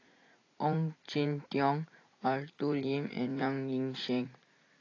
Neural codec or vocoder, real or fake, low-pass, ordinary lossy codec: vocoder, 44.1 kHz, 80 mel bands, Vocos; fake; 7.2 kHz; AAC, 32 kbps